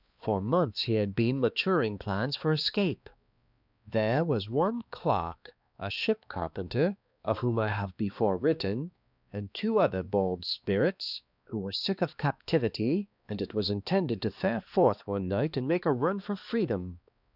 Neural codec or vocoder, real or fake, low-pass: codec, 16 kHz, 2 kbps, X-Codec, HuBERT features, trained on balanced general audio; fake; 5.4 kHz